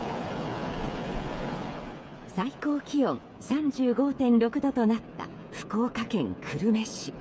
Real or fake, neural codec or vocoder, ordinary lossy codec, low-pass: fake; codec, 16 kHz, 8 kbps, FreqCodec, smaller model; none; none